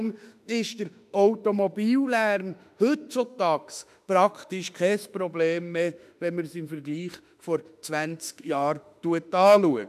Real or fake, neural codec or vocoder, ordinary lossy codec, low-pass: fake; autoencoder, 48 kHz, 32 numbers a frame, DAC-VAE, trained on Japanese speech; none; 14.4 kHz